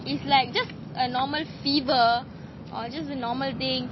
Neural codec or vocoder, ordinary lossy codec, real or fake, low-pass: none; MP3, 24 kbps; real; 7.2 kHz